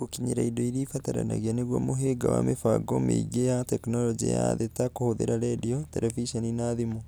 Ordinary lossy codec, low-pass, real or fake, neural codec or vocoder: none; none; real; none